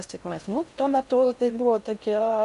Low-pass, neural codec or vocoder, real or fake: 10.8 kHz; codec, 16 kHz in and 24 kHz out, 0.6 kbps, FocalCodec, streaming, 4096 codes; fake